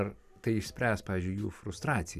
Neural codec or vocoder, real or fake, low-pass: none; real; 14.4 kHz